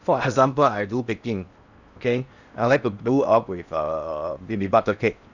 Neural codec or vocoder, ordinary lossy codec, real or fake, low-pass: codec, 16 kHz in and 24 kHz out, 0.8 kbps, FocalCodec, streaming, 65536 codes; none; fake; 7.2 kHz